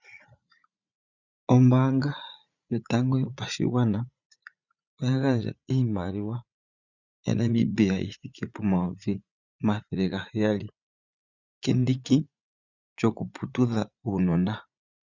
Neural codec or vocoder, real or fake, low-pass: vocoder, 44.1 kHz, 80 mel bands, Vocos; fake; 7.2 kHz